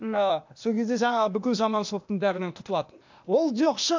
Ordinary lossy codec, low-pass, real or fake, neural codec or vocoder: MP3, 64 kbps; 7.2 kHz; fake; codec, 16 kHz, 0.8 kbps, ZipCodec